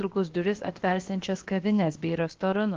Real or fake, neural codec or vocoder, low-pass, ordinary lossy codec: fake; codec, 16 kHz, about 1 kbps, DyCAST, with the encoder's durations; 7.2 kHz; Opus, 16 kbps